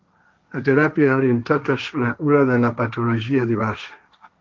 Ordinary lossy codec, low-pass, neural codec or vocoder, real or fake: Opus, 32 kbps; 7.2 kHz; codec, 16 kHz, 1.1 kbps, Voila-Tokenizer; fake